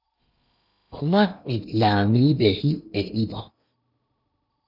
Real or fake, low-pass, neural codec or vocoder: fake; 5.4 kHz; codec, 16 kHz in and 24 kHz out, 0.8 kbps, FocalCodec, streaming, 65536 codes